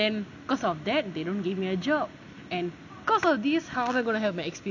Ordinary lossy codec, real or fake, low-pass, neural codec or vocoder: none; real; 7.2 kHz; none